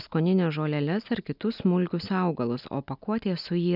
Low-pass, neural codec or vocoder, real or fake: 5.4 kHz; none; real